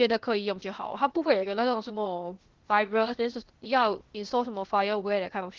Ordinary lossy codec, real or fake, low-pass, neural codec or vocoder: Opus, 16 kbps; fake; 7.2 kHz; codec, 16 kHz, 0.8 kbps, ZipCodec